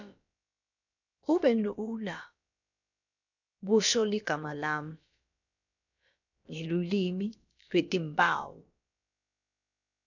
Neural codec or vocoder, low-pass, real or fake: codec, 16 kHz, about 1 kbps, DyCAST, with the encoder's durations; 7.2 kHz; fake